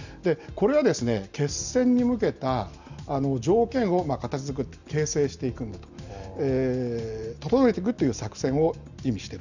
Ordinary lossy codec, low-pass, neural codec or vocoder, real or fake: none; 7.2 kHz; none; real